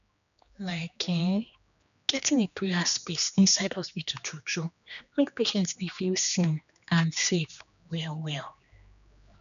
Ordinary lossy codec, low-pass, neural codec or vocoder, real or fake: none; 7.2 kHz; codec, 16 kHz, 2 kbps, X-Codec, HuBERT features, trained on general audio; fake